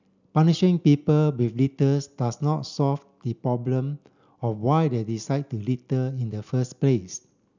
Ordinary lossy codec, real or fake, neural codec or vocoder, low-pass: none; real; none; 7.2 kHz